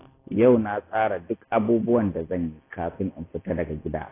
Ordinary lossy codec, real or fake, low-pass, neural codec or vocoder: AAC, 16 kbps; real; 3.6 kHz; none